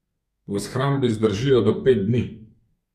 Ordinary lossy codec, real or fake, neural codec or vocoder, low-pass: none; fake; codec, 32 kHz, 1.9 kbps, SNAC; 14.4 kHz